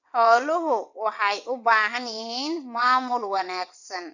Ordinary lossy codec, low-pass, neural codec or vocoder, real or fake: none; 7.2 kHz; vocoder, 22.05 kHz, 80 mel bands, WaveNeXt; fake